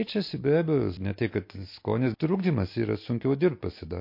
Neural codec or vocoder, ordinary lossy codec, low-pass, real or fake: none; MP3, 32 kbps; 5.4 kHz; real